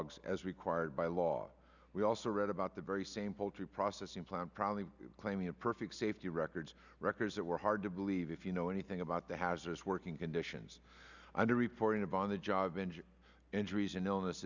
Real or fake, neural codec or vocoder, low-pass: real; none; 7.2 kHz